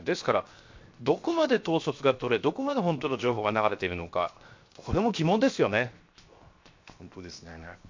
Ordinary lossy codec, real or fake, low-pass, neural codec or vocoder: MP3, 48 kbps; fake; 7.2 kHz; codec, 16 kHz, 0.7 kbps, FocalCodec